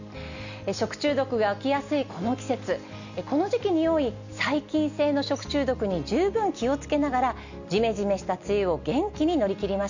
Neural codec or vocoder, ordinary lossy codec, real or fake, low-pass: none; none; real; 7.2 kHz